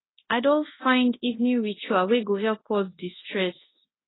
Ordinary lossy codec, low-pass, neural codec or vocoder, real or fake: AAC, 16 kbps; 7.2 kHz; codec, 24 kHz, 0.9 kbps, WavTokenizer, large speech release; fake